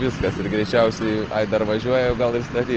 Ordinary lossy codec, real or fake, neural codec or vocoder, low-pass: Opus, 16 kbps; real; none; 7.2 kHz